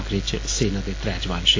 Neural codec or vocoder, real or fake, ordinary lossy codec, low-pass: none; real; AAC, 32 kbps; 7.2 kHz